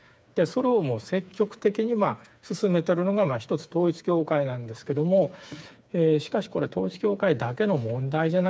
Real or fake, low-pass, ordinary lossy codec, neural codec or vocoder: fake; none; none; codec, 16 kHz, 4 kbps, FreqCodec, smaller model